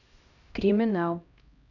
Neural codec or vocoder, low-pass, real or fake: codec, 16 kHz, 1 kbps, X-Codec, HuBERT features, trained on LibriSpeech; 7.2 kHz; fake